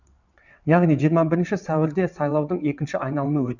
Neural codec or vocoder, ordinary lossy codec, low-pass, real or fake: vocoder, 22.05 kHz, 80 mel bands, WaveNeXt; none; 7.2 kHz; fake